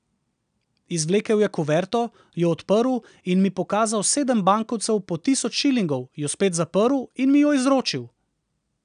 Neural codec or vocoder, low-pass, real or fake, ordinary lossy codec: none; 9.9 kHz; real; none